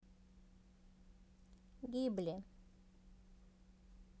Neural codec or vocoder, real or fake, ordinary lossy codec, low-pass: none; real; none; none